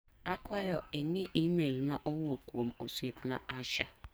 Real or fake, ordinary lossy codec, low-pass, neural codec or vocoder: fake; none; none; codec, 44.1 kHz, 2.6 kbps, SNAC